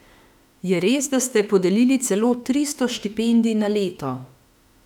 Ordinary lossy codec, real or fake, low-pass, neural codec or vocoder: none; fake; 19.8 kHz; autoencoder, 48 kHz, 32 numbers a frame, DAC-VAE, trained on Japanese speech